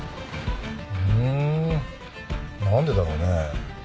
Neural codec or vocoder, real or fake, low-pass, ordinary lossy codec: none; real; none; none